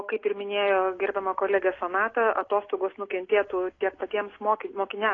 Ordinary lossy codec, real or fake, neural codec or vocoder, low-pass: AAC, 32 kbps; real; none; 7.2 kHz